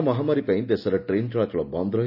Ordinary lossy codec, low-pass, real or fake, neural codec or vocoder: none; 5.4 kHz; real; none